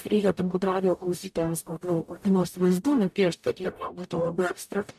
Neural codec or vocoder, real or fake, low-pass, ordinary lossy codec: codec, 44.1 kHz, 0.9 kbps, DAC; fake; 14.4 kHz; AAC, 96 kbps